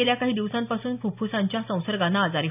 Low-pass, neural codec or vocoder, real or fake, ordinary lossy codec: 3.6 kHz; none; real; none